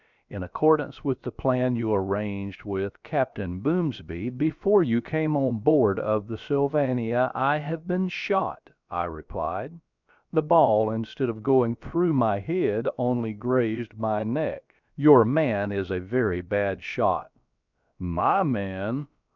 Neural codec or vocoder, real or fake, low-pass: codec, 16 kHz, about 1 kbps, DyCAST, with the encoder's durations; fake; 7.2 kHz